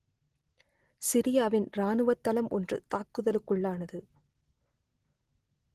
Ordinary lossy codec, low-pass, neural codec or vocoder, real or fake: Opus, 16 kbps; 14.4 kHz; none; real